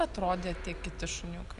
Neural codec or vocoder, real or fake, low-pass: none; real; 10.8 kHz